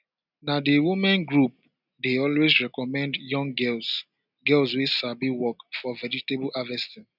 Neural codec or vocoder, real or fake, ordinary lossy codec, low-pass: none; real; none; 5.4 kHz